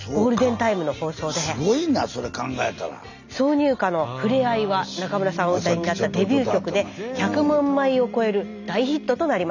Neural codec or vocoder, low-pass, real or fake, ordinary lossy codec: none; 7.2 kHz; real; none